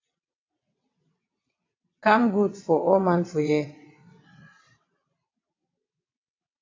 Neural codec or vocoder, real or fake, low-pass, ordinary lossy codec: vocoder, 22.05 kHz, 80 mel bands, WaveNeXt; fake; 7.2 kHz; AAC, 32 kbps